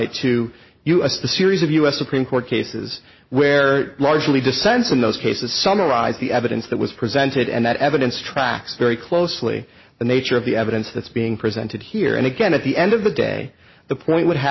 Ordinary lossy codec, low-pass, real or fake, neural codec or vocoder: MP3, 24 kbps; 7.2 kHz; real; none